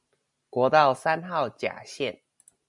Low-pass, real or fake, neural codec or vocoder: 10.8 kHz; real; none